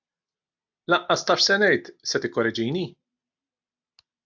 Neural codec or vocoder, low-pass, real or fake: none; 7.2 kHz; real